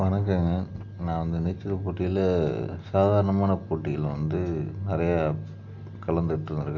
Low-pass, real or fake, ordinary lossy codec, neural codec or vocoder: 7.2 kHz; real; none; none